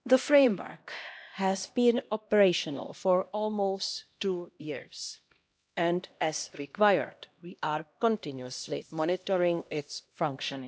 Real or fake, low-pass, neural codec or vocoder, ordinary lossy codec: fake; none; codec, 16 kHz, 1 kbps, X-Codec, HuBERT features, trained on LibriSpeech; none